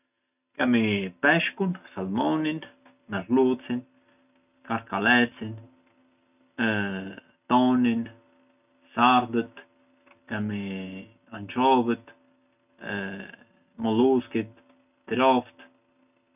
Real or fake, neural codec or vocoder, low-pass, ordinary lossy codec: real; none; 3.6 kHz; none